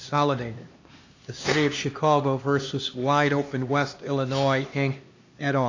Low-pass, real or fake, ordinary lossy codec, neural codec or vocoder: 7.2 kHz; fake; AAC, 48 kbps; codec, 16 kHz, 2 kbps, X-Codec, HuBERT features, trained on LibriSpeech